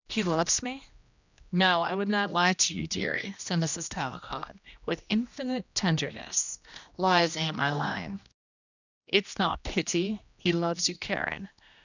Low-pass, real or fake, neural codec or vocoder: 7.2 kHz; fake; codec, 16 kHz, 1 kbps, X-Codec, HuBERT features, trained on general audio